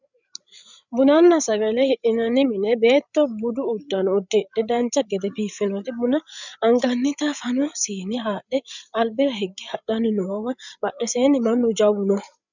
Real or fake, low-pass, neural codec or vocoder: fake; 7.2 kHz; codec, 16 kHz, 8 kbps, FreqCodec, larger model